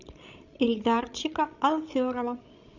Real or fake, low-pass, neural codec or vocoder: fake; 7.2 kHz; codec, 16 kHz, 16 kbps, FreqCodec, larger model